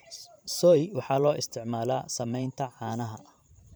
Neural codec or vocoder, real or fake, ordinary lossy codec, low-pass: vocoder, 44.1 kHz, 128 mel bands every 512 samples, BigVGAN v2; fake; none; none